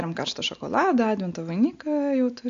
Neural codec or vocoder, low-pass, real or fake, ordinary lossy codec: none; 7.2 kHz; real; AAC, 48 kbps